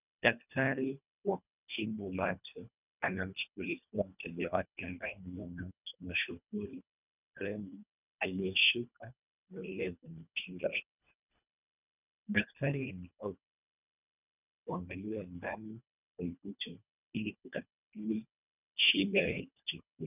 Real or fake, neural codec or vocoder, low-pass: fake; codec, 24 kHz, 1.5 kbps, HILCodec; 3.6 kHz